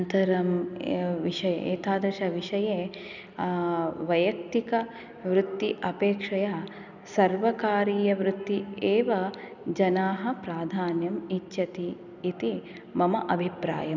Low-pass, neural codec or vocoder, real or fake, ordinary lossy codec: 7.2 kHz; none; real; none